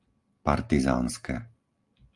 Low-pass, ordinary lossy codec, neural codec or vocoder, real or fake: 10.8 kHz; Opus, 32 kbps; none; real